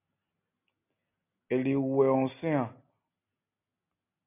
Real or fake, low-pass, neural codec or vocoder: real; 3.6 kHz; none